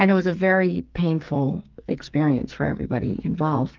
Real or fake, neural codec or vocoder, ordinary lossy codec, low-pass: fake; codec, 44.1 kHz, 2.6 kbps, SNAC; Opus, 32 kbps; 7.2 kHz